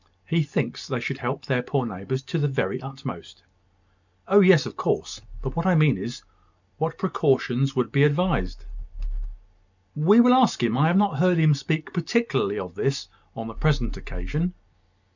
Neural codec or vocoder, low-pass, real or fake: none; 7.2 kHz; real